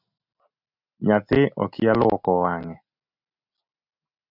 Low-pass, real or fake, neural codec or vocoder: 5.4 kHz; real; none